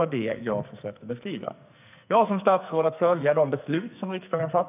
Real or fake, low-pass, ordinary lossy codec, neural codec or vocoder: fake; 3.6 kHz; none; codec, 44.1 kHz, 2.6 kbps, SNAC